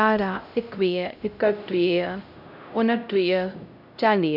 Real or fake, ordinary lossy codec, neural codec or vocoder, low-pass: fake; none; codec, 16 kHz, 0.5 kbps, X-Codec, HuBERT features, trained on LibriSpeech; 5.4 kHz